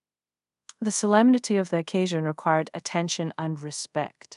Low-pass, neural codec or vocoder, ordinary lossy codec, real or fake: 10.8 kHz; codec, 24 kHz, 0.5 kbps, DualCodec; none; fake